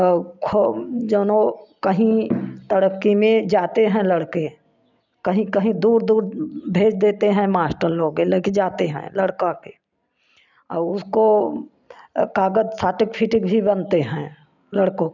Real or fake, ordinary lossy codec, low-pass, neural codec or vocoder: real; none; 7.2 kHz; none